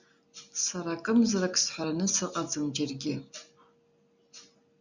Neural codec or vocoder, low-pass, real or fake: none; 7.2 kHz; real